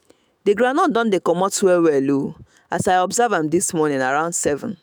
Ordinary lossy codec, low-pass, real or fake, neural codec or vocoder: none; none; fake; autoencoder, 48 kHz, 128 numbers a frame, DAC-VAE, trained on Japanese speech